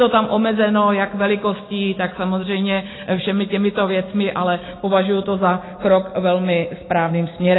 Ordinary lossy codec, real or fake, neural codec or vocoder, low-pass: AAC, 16 kbps; real; none; 7.2 kHz